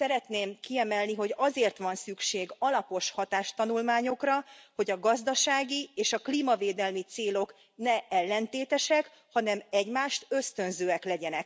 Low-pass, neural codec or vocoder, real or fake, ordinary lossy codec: none; none; real; none